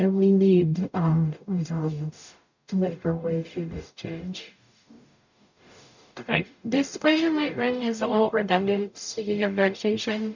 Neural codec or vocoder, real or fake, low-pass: codec, 44.1 kHz, 0.9 kbps, DAC; fake; 7.2 kHz